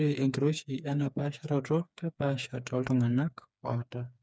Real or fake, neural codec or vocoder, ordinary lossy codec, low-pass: fake; codec, 16 kHz, 4 kbps, FreqCodec, smaller model; none; none